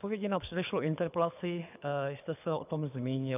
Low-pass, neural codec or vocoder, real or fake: 3.6 kHz; codec, 24 kHz, 6 kbps, HILCodec; fake